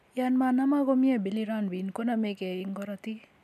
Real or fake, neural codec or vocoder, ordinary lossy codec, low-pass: real; none; none; 14.4 kHz